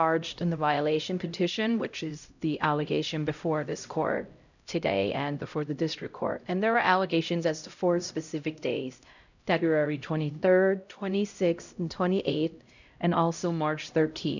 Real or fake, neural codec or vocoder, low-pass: fake; codec, 16 kHz, 0.5 kbps, X-Codec, HuBERT features, trained on LibriSpeech; 7.2 kHz